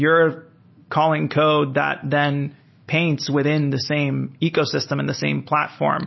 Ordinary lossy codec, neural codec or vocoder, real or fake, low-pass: MP3, 24 kbps; none; real; 7.2 kHz